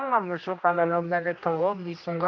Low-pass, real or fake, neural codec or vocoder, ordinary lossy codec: 7.2 kHz; fake; codec, 16 kHz, 1 kbps, X-Codec, HuBERT features, trained on general audio; MP3, 48 kbps